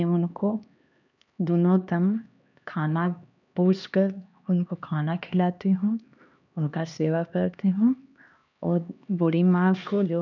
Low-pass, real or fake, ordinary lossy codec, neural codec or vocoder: 7.2 kHz; fake; none; codec, 16 kHz, 2 kbps, X-Codec, HuBERT features, trained on LibriSpeech